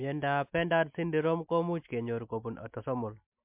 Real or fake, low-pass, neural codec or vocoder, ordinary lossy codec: real; 3.6 kHz; none; MP3, 32 kbps